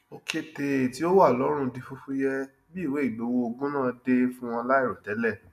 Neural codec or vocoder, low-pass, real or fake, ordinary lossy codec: none; 14.4 kHz; real; none